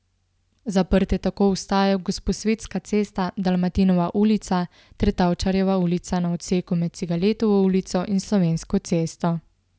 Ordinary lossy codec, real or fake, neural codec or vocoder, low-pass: none; real; none; none